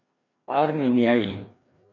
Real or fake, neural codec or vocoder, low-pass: fake; codec, 16 kHz, 2 kbps, FreqCodec, larger model; 7.2 kHz